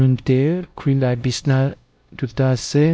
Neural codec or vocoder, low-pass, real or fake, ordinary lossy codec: codec, 16 kHz, 1 kbps, X-Codec, WavLM features, trained on Multilingual LibriSpeech; none; fake; none